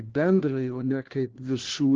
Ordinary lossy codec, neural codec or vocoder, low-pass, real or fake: Opus, 16 kbps; codec, 16 kHz, 1 kbps, FunCodec, trained on LibriTTS, 50 frames a second; 7.2 kHz; fake